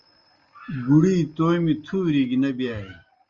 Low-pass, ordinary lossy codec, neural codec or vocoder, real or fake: 7.2 kHz; Opus, 32 kbps; none; real